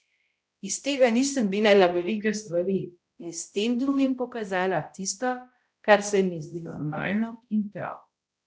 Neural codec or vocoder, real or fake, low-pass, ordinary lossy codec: codec, 16 kHz, 0.5 kbps, X-Codec, HuBERT features, trained on balanced general audio; fake; none; none